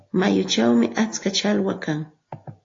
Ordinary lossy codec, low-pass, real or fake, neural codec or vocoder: AAC, 32 kbps; 7.2 kHz; real; none